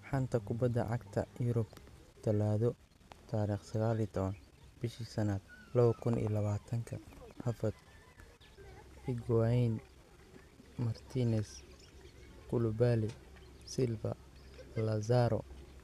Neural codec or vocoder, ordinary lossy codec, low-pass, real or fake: none; none; 14.4 kHz; real